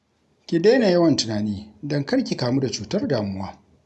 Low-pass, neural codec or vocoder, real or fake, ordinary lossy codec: none; none; real; none